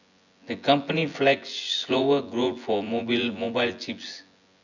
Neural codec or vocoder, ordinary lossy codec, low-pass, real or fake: vocoder, 24 kHz, 100 mel bands, Vocos; none; 7.2 kHz; fake